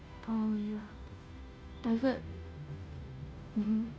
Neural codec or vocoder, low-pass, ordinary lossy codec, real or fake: codec, 16 kHz, 0.5 kbps, FunCodec, trained on Chinese and English, 25 frames a second; none; none; fake